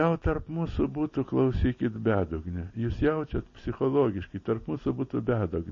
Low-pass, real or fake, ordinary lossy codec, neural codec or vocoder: 7.2 kHz; real; MP3, 32 kbps; none